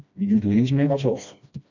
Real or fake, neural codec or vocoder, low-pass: fake; codec, 16 kHz, 1 kbps, FreqCodec, smaller model; 7.2 kHz